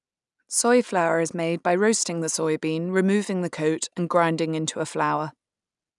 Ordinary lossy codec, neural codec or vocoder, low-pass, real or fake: none; none; 10.8 kHz; real